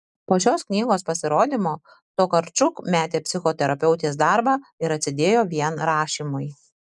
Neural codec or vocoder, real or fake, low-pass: none; real; 10.8 kHz